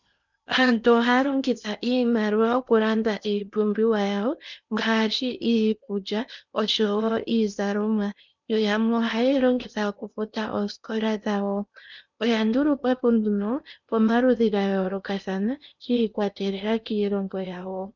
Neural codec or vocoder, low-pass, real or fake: codec, 16 kHz in and 24 kHz out, 0.8 kbps, FocalCodec, streaming, 65536 codes; 7.2 kHz; fake